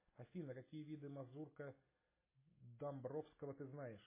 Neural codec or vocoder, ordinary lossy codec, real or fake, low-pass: none; MP3, 16 kbps; real; 3.6 kHz